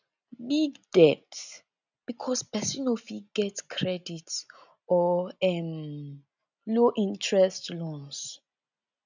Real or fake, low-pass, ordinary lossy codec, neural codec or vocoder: real; 7.2 kHz; none; none